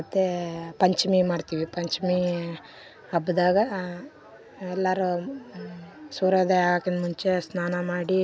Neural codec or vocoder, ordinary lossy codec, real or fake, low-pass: none; none; real; none